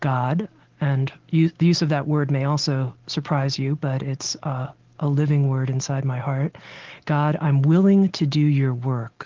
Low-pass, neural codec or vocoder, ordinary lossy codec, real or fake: 7.2 kHz; none; Opus, 16 kbps; real